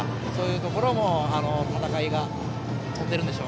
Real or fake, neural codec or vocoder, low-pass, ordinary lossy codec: real; none; none; none